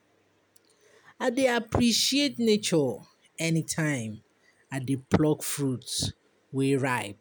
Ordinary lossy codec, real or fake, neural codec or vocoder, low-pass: none; real; none; none